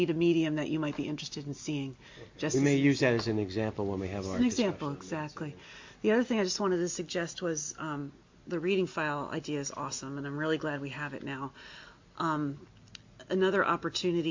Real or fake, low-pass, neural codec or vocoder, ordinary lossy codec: real; 7.2 kHz; none; MP3, 48 kbps